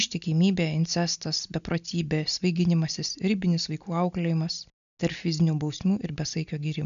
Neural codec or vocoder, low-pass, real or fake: none; 7.2 kHz; real